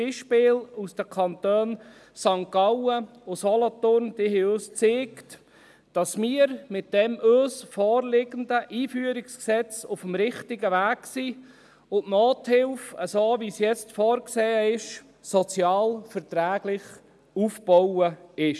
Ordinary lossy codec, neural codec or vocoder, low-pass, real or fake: none; none; none; real